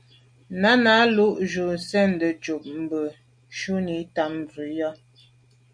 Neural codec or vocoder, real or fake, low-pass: none; real; 9.9 kHz